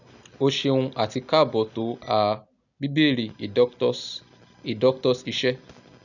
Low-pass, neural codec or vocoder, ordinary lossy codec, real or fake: 7.2 kHz; none; none; real